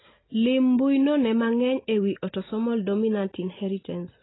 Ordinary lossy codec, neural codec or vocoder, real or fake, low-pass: AAC, 16 kbps; none; real; 7.2 kHz